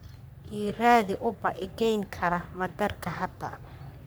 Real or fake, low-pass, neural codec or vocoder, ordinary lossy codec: fake; none; codec, 44.1 kHz, 3.4 kbps, Pupu-Codec; none